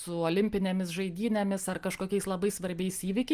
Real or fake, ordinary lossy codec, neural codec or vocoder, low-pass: real; Opus, 32 kbps; none; 14.4 kHz